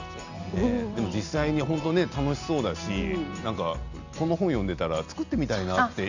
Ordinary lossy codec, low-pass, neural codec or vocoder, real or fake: none; 7.2 kHz; none; real